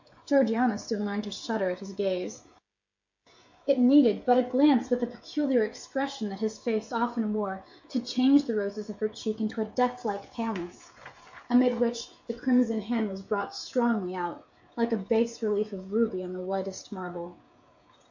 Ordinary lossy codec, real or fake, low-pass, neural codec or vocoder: MP3, 48 kbps; fake; 7.2 kHz; codec, 16 kHz, 16 kbps, FreqCodec, smaller model